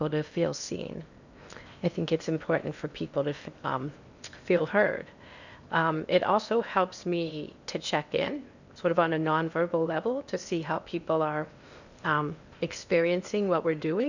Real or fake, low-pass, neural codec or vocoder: fake; 7.2 kHz; codec, 16 kHz in and 24 kHz out, 0.8 kbps, FocalCodec, streaming, 65536 codes